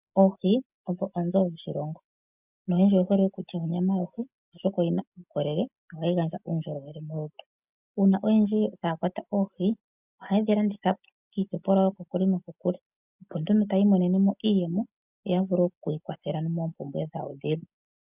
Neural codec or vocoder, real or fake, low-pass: none; real; 3.6 kHz